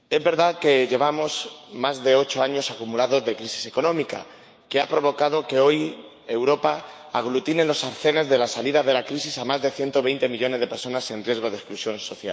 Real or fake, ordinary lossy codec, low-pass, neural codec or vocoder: fake; none; none; codec, 16 kHz, 6 kbps, DAC